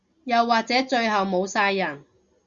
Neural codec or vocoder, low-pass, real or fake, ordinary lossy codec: none; 7.2 kHz; real; Opus, 64 kbps